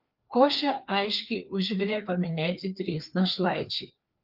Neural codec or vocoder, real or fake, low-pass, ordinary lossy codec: codec, 16 kHz, 2 kbps, FreqCodec, larger model; fake; 5.4 kHz; Opus, 24 kbps